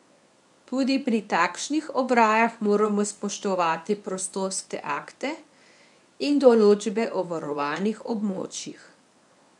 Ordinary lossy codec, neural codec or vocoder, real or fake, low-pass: none; codec, 24 kHz, 0.9 kbps, WavTokenizer, medium speech release version 1; fake; 10.8 kHz